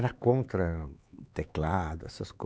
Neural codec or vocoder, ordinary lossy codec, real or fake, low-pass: codec, 16 kHz, 4 kbps, X-Codec, HuBERT features, trained on LibriSpeech; none; fake; none